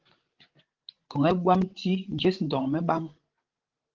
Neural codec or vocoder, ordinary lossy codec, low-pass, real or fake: codec, 16 kHz, 16 kbps, FreqCodec, larger model; Opus, 16 kbps; 7.2 kHz; fake